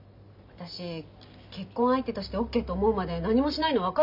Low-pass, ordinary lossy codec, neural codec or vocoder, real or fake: 5.4 kHz; none; none; real